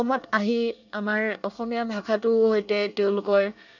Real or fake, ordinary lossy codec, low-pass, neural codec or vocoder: fake; none; 7.2 kHz; codec, 24 kHz, 1 kbps, SNAC